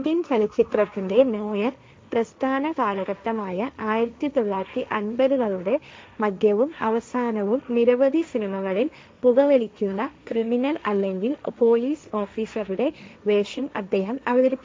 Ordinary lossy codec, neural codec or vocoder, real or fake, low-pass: none; codec, 16 kHz, 1.1 kbps, Voila-Tokenizer; fake; none